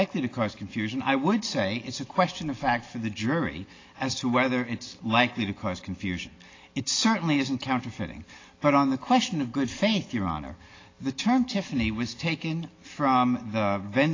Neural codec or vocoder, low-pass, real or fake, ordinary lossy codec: none; 7.2 kHz; real; AAC, 32 kbps